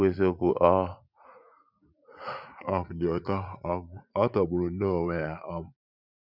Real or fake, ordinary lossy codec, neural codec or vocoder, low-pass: fake; none; vocoder, 44.1 kHz, 80 mel bands, Vocos; 5.4 kHz